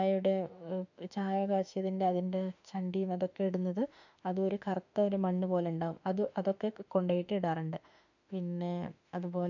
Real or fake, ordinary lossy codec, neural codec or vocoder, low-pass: fake; none; autoencoder, 48 kHz, 32 numbers a frame, DAC-VAE, trained on Japanese speech; 7.2 kHz